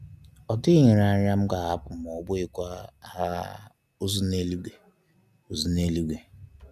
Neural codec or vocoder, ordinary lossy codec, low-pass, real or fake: none; none; 14.4 kHz; real